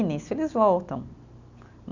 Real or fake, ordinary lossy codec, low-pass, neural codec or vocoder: real; none; 7.2 kHz; none